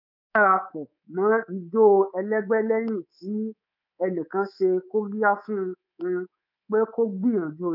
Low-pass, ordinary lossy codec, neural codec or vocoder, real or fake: 5.4 kHz; none; codec, 24 kHz, 3.1 kbps, DualCodec; fake